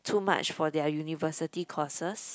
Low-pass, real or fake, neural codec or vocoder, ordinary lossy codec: none; real; none; none